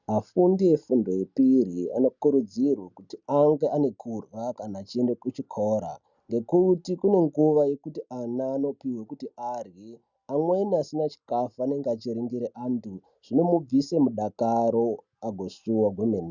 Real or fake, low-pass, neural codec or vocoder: real; 7.2 kHz; none